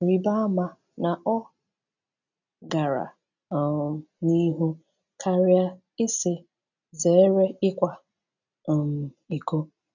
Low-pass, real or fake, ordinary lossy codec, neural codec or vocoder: 7.2 kHz; real; none; none